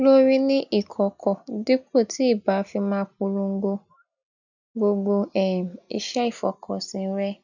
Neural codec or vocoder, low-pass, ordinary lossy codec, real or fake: none; 7.2 kHz; none; real